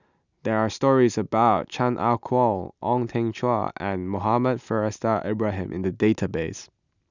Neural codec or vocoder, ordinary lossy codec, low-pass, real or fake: none; none; 7.2 kHz; real